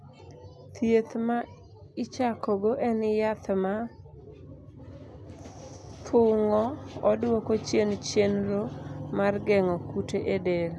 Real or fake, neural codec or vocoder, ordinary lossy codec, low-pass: real; none; none; none